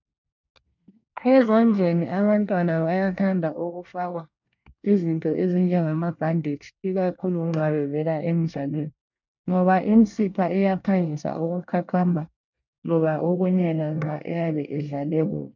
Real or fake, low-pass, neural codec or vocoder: fake; 7.2 kHz; codec, 24 kHz, 1 kbps, SNAC